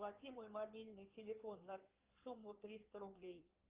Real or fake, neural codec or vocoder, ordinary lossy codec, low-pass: fake; codec, 16 kHz in and 24 kHz out, 2.2 kbps, FireRedTTS-2 codec; Opus, 24 kbps; 3.6 kHz